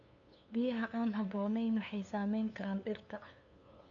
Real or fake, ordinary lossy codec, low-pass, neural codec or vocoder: fake; AAC, 64 kbps; 7.2 kHz; codec, 16 kHz, 2 kbps, FunCodec, trained on LibriTTS, 25 frames a second